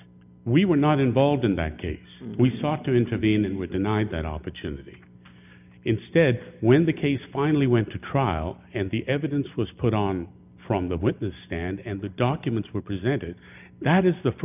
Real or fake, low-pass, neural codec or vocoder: real; 3.6 kHz; none